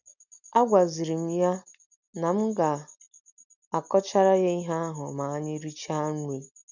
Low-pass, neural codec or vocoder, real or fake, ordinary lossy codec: 7.2 kHz; none; real; none